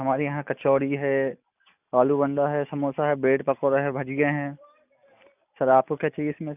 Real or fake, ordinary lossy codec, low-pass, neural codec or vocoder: real; none; 3.6 kHz; none